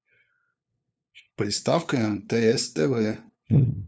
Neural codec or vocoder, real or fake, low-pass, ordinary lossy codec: codec, 16 kHz, 2 kbps, FunCodec, trained on LibriTTS, 25 frames a second; fake; none; none